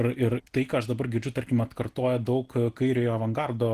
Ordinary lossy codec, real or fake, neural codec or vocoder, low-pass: Opus, 16 kbps; real; none; 14.4 kHz